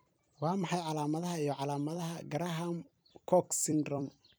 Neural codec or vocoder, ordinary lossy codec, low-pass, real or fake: vocoder, 44.1 kHz, 128 mel bands every 256 samples, BigVGAN v2; none; none; fake